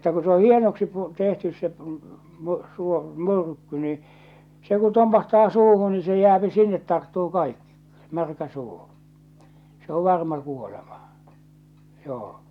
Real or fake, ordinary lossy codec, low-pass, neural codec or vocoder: real; none; 19.8 kHz; none